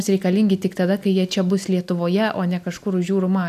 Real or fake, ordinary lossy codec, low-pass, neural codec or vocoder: real; AAC, 96 kbps; 14.4 kHz; none